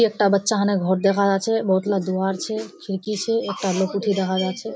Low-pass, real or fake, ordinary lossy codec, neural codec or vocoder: none; real; none; none